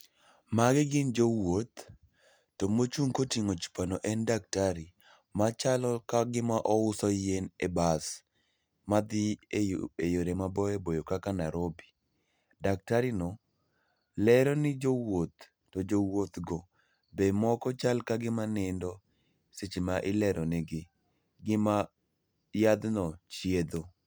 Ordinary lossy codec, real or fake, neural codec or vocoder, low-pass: none; real; none; none